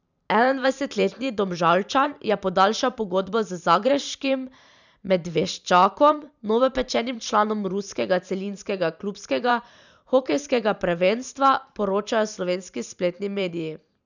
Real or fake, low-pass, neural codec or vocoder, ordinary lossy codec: fake; 7.2 kHz; vocoder, 44.1 kHz, 128 mel bands every 512 samples, BigVGAN v2; none